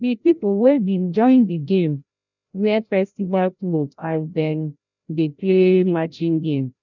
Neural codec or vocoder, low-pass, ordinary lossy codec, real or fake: codec, 16 kHz, 0.5 kbps, FreqCodec, larger model; 7.2 kHz; none; fake